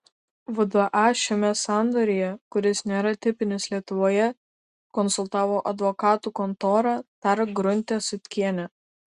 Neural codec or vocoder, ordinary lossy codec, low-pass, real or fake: none; Opus, 64 kbps; 10.8 kHz; real